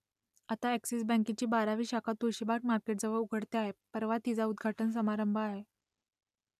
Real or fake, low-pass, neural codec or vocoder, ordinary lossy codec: real; 14.4 kHz; none; none